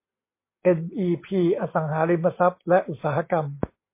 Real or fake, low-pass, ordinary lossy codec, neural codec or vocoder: real; 3.6 kHz; MP3, 24 kbps; none